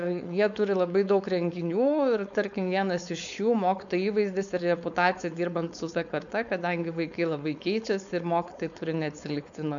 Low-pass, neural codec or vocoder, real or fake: 7.2 kHz; codec, 16 kHz, 4.8 kbps, FACodec; fake